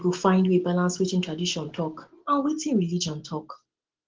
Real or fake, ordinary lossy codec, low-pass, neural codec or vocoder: real; Opus, 16 kbps; 7.2 kHz; none